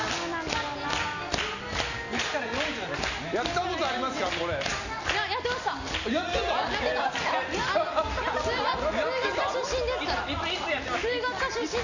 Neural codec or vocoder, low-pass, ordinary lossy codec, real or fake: none; 7.2 kHz; none; real